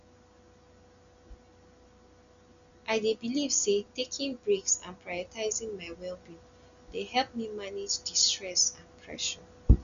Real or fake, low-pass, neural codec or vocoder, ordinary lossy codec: real; 7.2 kHz; none; none